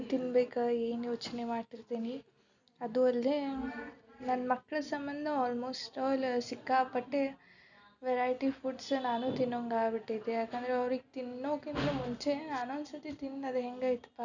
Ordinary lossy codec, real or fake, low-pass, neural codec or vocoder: none; real; 7.2 kHz; none